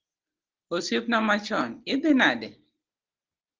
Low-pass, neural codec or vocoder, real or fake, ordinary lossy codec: 7.2 kHz; none; real; Opus, 16 kbps